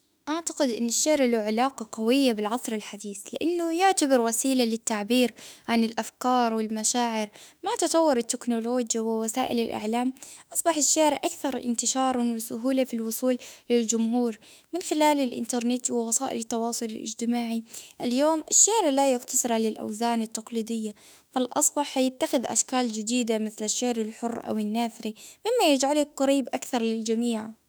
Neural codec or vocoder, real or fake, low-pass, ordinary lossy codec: autoencoder, 48 kHz, 32 numbers a frame, DAC-VAE, trained on Japanese speech; fake; none; none